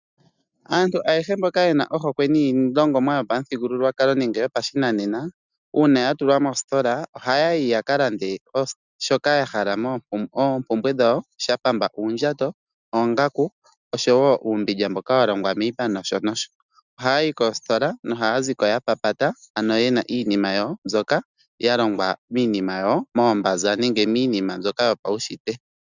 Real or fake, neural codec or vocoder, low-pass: real; none; 7.2 kHz